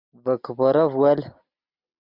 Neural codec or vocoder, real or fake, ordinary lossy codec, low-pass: none; real; MP3, 48 kbps; 5.4 kHz